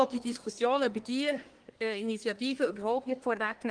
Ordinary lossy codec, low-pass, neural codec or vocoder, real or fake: Opus, 24 kbps; 9.9 kHz; codec, 24 kHz, 1 kbps, SNAC; fake